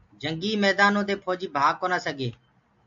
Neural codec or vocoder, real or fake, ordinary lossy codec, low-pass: none; real; AAC, 64 kbps; 7.2 kHz